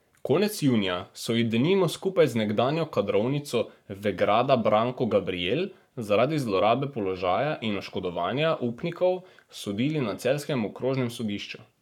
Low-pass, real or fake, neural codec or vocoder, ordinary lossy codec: 19.8 kHz; fake; codec, 44.1 kHz, 7.8 kbps, Pupu-Codec; none